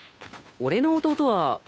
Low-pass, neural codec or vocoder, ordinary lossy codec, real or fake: none; codec, 16 kHz, 2 kbps, FunCodec, trained on Chinese and English, 25 frames a second; none; fake